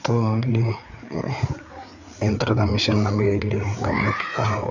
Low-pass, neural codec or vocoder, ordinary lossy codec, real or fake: 7.2 kHz; codec, 16 kHz, 4 kbps, FreqCodec, larger model; none; fake